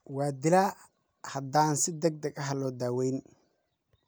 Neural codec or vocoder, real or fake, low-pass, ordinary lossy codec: none; real; none; none